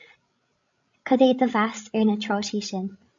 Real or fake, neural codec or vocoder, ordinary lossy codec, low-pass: fake; codec, 16 kHz, 16 kbps, FreqCodec, larger model; AAC, 64 kbps; 7.2 kHz